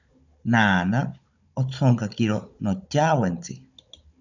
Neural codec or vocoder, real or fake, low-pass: codec, 16 kHz, 16 kbps, FunCodec, trained on Chinese and English, 50 frames a second; fake; 7.2 kHz